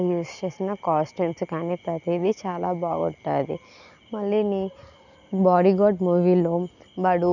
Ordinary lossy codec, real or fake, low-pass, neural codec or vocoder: none; real; 7.2 kHz; none